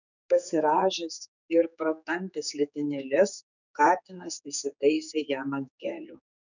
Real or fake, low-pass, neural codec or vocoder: fake; 7.2 kHz; codec, 16 kHz, 4 kbps, X-Codec, HuBERT features, trained on general audio